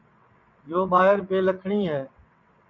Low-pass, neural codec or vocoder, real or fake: 7.2 kHz; vocoder, 22.05 kHz, 80 mel bands, WaveNeXt; fake